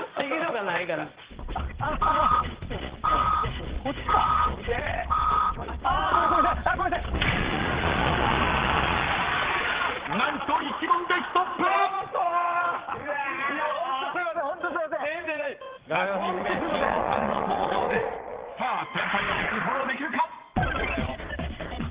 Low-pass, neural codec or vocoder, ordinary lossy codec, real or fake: 3.6 kHz; vocoder, 22.05 kHz, 80 mel bands, Vocos; Opus, 16 kbps; fake